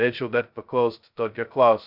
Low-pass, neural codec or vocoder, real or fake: 5.4 kHz; codec, 16 kHz, 0.2 kbps, FocalCodec; fake